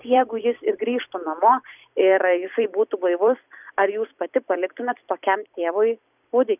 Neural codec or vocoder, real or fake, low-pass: vocoder, 44.1 kHz, 128 mel bands every 256 samples, BigVGAN v2; fake; 3.6 kHz